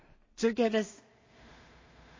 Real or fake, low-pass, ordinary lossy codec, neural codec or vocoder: fake; 7.2 kHz; MP3, 32 kbps; codec, 16 kHz in and 24 kHz out, 0.4 kbps, LongCat-Audio-Codec, two codebook decoder